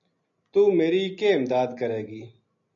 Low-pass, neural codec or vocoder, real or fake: 7.2 kHz; none; real